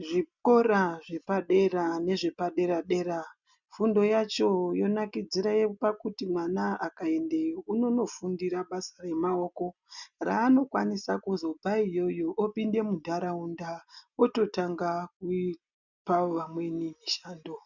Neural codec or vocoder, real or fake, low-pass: none; real; 7.2 kHz